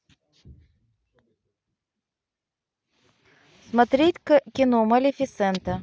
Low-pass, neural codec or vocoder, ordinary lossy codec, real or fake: none; none; none; real